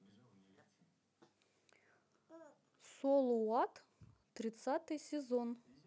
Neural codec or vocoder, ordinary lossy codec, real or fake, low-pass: none; none; real; none